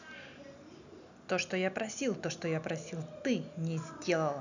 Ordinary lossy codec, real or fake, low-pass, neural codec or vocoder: none; real; 7.2 kHz; none